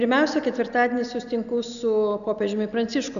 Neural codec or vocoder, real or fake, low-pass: none; real; 7.2 kHz